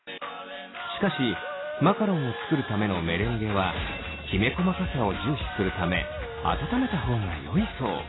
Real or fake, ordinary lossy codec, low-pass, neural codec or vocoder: real; AAC, 16 kbps; 7.2 kHz; none